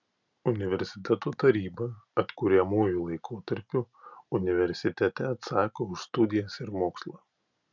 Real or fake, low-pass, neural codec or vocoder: real; 7.2 kHz; none